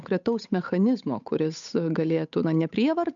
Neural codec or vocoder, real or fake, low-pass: codec, 16 kHz, 8 kbps, FunCodec, trained on Chinese and English, 25 frames a second; fake; 7.2 kHz